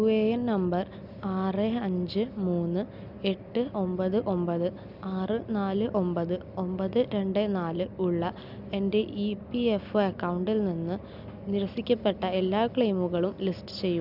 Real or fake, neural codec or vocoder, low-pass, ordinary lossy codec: real; none; 5.4 kHz; none